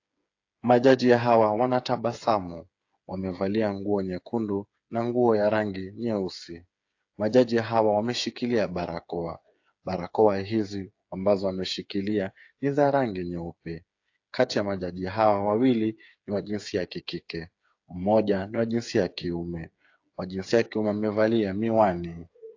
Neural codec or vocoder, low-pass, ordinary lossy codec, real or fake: codec, 16 kHz, 8 kbps, FreqCodec, smaller model; 7.2 kHz; AAC, 48 kbps; fake